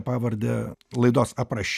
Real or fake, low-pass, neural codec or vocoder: real; 14.4 kHz; none